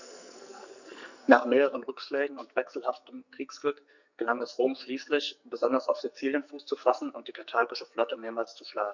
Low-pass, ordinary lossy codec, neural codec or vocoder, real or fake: 7.2 kHz; none; codec, 44.1 kHz, 2.6 kbps, SNAC; fake